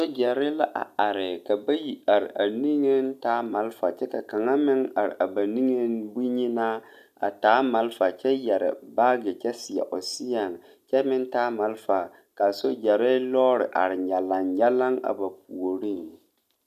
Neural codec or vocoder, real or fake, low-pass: none; real; 14.4 kHz